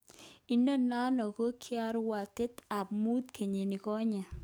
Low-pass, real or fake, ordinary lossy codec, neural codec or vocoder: none; fake; none; codec, 44.1 kHz, 7.8 kbps, DAC